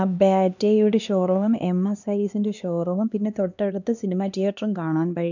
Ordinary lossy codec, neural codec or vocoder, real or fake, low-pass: none; codec, 16 kHz, 2 kbps, X-Codec, HuBERT features, trained on LibriSpeech; fake; 7.2 kHz